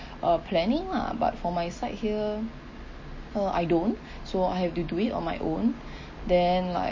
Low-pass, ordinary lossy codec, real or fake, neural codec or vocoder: 7.2 kHz; MP3, 32 kbps; real; none